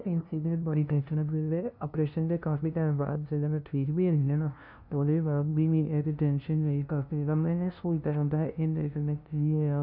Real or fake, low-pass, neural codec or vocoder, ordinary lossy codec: fake; 5.4 kHz; codec, 16 kHz, 0.5 kbps, FunCodec, trained on LibriTTS, 25 frames a second; none